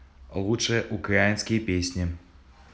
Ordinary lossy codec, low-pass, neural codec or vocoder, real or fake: none; none; none; real